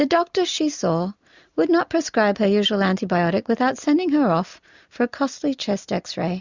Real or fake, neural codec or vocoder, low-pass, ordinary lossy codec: real; none; 7.2 kHz; Opus, 64 kbps